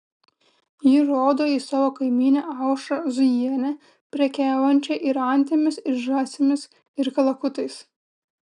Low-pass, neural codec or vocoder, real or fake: 9.9 kHz; none; real